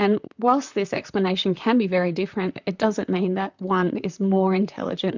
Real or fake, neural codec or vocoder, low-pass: fake; vocoder, 44.1 kHz, 128 mel bands, Pupu-Vocoder; 7.2 kHz